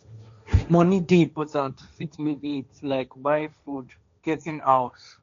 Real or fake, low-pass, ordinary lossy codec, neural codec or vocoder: fake; 7.2 kHz; MP3, 64 kbps; codec, 16 kHz, 1.1 kbps, Voila-Tokenizer